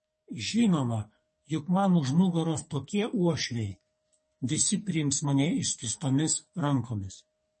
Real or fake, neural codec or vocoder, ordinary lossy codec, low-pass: fake; codec, 44.1 kHz, 2.6 kbps, SNAC; MP3, 32 kbps; 10.8 kHz